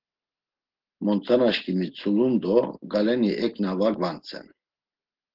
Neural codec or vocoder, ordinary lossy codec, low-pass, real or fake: none; Opus, 16 kbps; 5.4 kHz; real